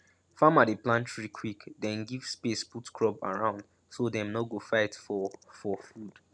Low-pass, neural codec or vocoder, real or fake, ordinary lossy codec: 9.9 kHz; none; real; none